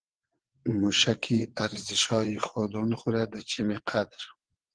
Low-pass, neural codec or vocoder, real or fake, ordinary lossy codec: 9.9 kHz; vocoder, 22.05 kHz, 80 mel bands, WaveNeXt; fake; Opus, 16 kbps